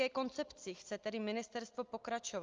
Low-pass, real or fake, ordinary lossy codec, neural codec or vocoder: 7.2 kHz; real; Opus, 24 kbps; none